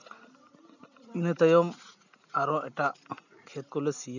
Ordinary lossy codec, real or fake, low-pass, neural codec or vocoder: none; real; 7.2 kHz; none